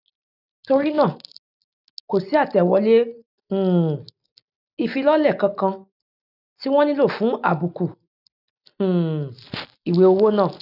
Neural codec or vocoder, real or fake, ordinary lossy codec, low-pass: none; real; none; 5.4 kHz